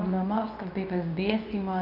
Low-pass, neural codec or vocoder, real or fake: 5.4 kHz; codec, 24 kHz, 0.9 kbps, WavTokenizer, medium speech release version 2; fake